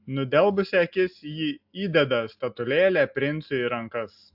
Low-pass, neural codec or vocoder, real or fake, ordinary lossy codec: 5.4 kHz; none; real; AAC, 48 kbps